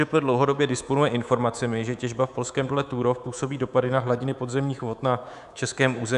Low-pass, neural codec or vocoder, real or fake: 10.8 kHz; codec, 24 kHz, 3.1 kbps, DualCodec; fake